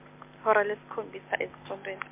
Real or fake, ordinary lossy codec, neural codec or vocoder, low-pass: real; MP3, 32 kbps; none; 3.6 kHz